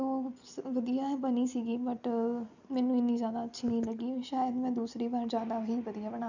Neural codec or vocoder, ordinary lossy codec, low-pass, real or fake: none; none; 7.2 kHz; real